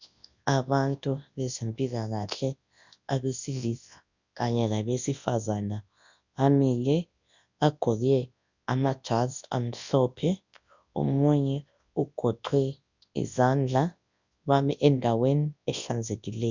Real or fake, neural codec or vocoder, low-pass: fake; codec, 24 kHz, 0.9 kbps, WavTokenizer, large speech release; 7.2 kHz